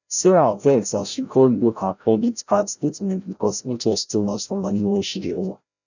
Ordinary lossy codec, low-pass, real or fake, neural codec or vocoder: none; 7.2 kHz; fake; codec, 16 kHz, 0.5 kbps, FreqCodec, larger model